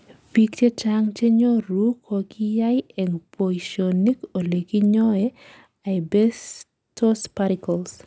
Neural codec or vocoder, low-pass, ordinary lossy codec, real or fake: none; none; none; real